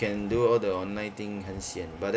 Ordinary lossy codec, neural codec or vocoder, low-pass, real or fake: none; none; none; real